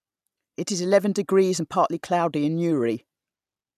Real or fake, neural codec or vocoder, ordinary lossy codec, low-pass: real; none; none; 14.4 kHz